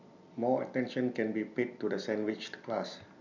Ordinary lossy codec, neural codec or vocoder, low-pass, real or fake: none; none; 7.2 kHz; real